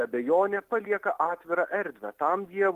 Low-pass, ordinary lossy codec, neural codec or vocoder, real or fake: 14.4 kHz; Opus, 24 kbps; none; real